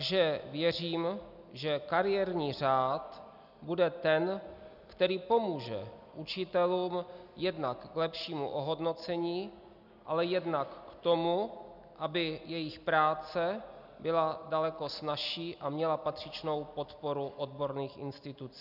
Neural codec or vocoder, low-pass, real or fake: none; 5.4 kHz; real